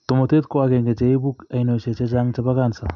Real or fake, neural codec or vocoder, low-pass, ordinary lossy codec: real; none; 7.2 kHz; none